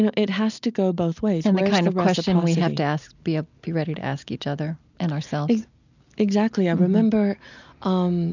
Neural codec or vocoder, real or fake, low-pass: none; real; 7.2 kHz